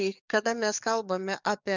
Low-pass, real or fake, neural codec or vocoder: 7.2 kHz; fake; codec, 44.1 kHz, 7.8 kbps, DAC